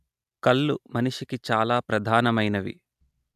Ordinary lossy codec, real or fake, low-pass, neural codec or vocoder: AAC, 96 kbps; real; 14.4 kHz; none